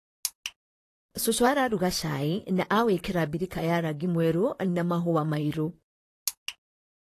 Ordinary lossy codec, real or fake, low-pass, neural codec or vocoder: AAC, 48 kbps; fake; 14.4 kHz; vocoder, 44.1 kHz, 128 mel bands, Pupu-Vocoder